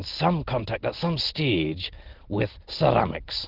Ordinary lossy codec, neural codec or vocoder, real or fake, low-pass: Opus, 16 kbps; none; real; 5.4 kHz